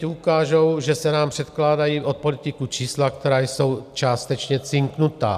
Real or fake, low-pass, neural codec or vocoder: real; 14.4 kHz; none